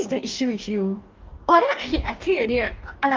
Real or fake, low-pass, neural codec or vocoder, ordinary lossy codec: fake; 7.2 kHz; codec, 44.1 kHz, 2.6 kbps, DAC; Opus, 32 kbps